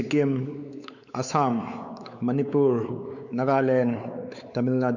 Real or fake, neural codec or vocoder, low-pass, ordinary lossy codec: fake; codec, 16 kHz, 4 kbps, X-Codec, WavLM features, trained on Multilingual LibriSpeech; 7.2 kHz; none